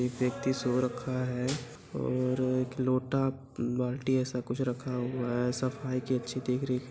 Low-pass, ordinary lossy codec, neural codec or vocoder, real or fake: none; none; none; real